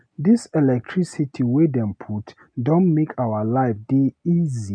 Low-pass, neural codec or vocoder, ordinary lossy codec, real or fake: 9.9 kHz; none; none; real